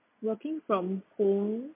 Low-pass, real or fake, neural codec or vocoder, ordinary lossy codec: 3.6 kHz; real; none; MP3, 24 kbps